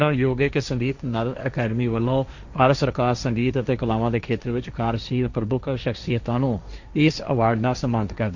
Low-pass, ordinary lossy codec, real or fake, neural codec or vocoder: 7.2 kHz; none; fake; codec, 16 kHz, 1.1 kbps, Voila-Tokenizer